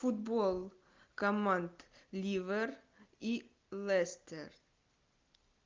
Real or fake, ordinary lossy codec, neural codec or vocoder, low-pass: real; Opus, 24 kbps; none; 7.2 kHz